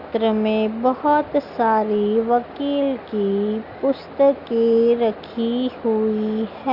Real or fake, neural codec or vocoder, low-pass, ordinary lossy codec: real; none; 5.4 kHz; Opus, 64 kbps